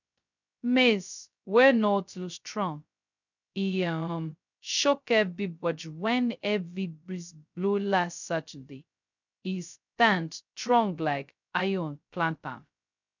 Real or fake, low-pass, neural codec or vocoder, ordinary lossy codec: fake; 7.2 kHz; codec, 16 kHz, 0.2 kbps, FocalCodec; none